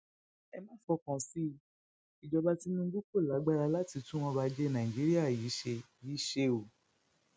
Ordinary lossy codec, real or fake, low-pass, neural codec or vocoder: none; real; none; none